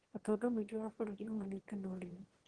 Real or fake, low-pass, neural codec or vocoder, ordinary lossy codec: fake; 9.9 kHz; autoencoder, 22.05 kHz, a latent of 192 numbers a frame, VITS, trained on one speaker; Opus, 16 kbps